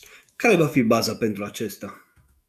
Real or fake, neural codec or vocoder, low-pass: fake; autoencoder, 48 kHz, 128 numbers a frame, DAC-VAE, trained on Japanese speech; 14.4 kHz